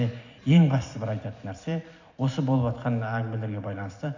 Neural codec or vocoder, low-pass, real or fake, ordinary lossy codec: none; 7.2 kHz; real; AAC, 48 kbps